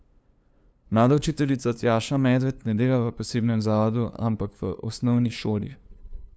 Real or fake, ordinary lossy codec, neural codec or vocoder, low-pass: fake; none; codec, 16 kHz, 2 kbps, FunCodec, trained on LibriTTS, 25 frames a second; none